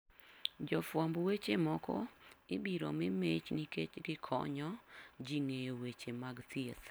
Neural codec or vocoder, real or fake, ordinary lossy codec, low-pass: none; real; none; none